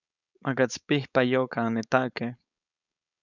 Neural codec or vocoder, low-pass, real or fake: codec, 16 kHz, 4.8 kbps, FACodec; 7.2 kHz; fake